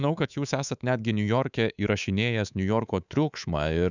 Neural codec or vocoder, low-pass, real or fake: codec, 24 kHz, 3.1 kbps, DualCodec; 7.2 kHz; fake